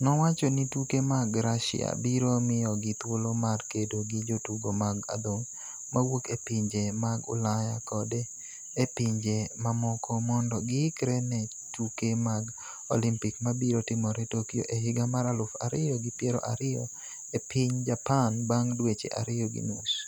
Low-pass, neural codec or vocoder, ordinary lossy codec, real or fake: none; none; none; real